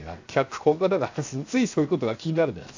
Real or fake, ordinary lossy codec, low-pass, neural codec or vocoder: fake; AAC, 48 kbps; 7.2 kHz; codec, 16 kHz, 0.7 kbps, FocalCodec